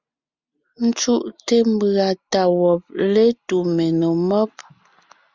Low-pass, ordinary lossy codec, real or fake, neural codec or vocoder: 7.2 kHz; Opus, 64 kbps; real; none